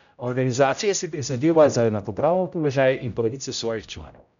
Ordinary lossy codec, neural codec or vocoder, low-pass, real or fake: none; codec, 16 kHz, 0.5 kbps, X-Codec, HuBERT features, trained on general audio; 7.2 kHz; fake